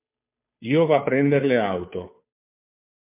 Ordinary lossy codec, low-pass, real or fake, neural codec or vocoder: MP3, 32 kbps; 3.6 kHz; fake; codec, 16 kHz, 2 kbps, FunCodec, trained on Chinese and English, 25 frames a second